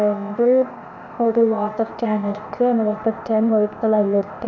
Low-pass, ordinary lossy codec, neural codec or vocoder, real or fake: 7.2 kHz; none; codec, 16 kHz, 0.8 kbps, ZipCodec; fake